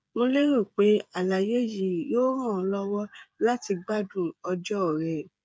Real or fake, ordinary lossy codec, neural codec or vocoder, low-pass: fake; none; codec, 16 kHz, 8 kbps, FreqCodec, smaller model; none